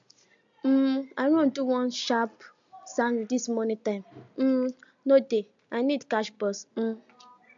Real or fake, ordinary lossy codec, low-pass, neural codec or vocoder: real; MP3, 64 kbps; 7.2 kHz; none